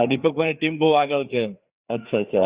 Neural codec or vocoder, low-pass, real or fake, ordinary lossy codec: codec, 16 kHz, 4 kbps, FreqCodec, larger model; 3.6 kHz; fake; Opus, 64 kbps